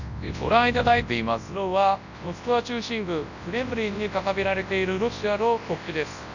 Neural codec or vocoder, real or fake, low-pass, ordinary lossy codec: codec, 24 kHz, 0.9 kbps, WavTokenizer, large speech release; fake; 7.2 kHz; none